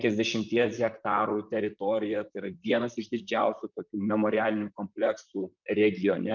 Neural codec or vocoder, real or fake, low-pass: vocoder, 44.1 kHz, 128 mel bands, Pupu-Vocoder; fake; 7.2 kHz